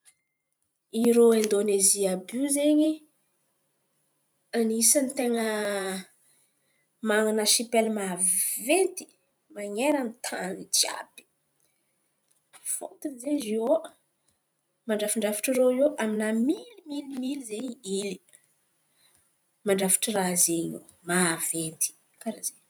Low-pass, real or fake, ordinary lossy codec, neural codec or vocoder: none; fake; none; vocoder, 44.1 kHz, 128 mel bands every 512 samples, BigVGAN v2